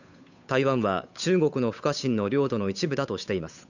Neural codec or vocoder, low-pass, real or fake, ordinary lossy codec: codec, 16 kHz, 8 kbps, FunCodec, trained on Chinese and English, 25 frames a second; 7.2 kHz; fake; none